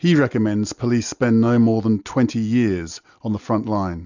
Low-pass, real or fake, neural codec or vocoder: 7.2 kHz; real; none